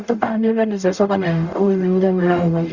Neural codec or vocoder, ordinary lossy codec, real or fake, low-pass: codec, 44.1 kHz, 0.9 kbps, DAC; Opus, 64 kbps; fake; 7.2 kHz